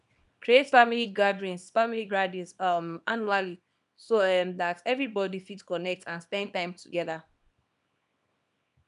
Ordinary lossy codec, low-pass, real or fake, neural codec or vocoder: none; 10.8 kHz; fake; codec, 24 kHz, 0.9 kbps, WavTokenizer, small release